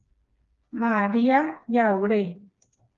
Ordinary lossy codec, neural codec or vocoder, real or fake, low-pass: Opus, 32 kbps; codec, 16 kHz, 2 kbps, FreqCodec, smaller model; fake; 7.2 kHz